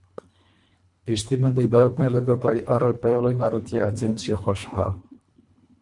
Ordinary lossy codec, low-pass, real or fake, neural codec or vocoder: MP3, 64 kbps; 10.8 kHz; fake; codec, 24 kHz, 1.5 kbps, HILCodec